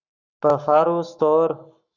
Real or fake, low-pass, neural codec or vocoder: fake; 7.2 kHz; codec, 44.1 kHz, 7.8 kbps, Pupu-Codec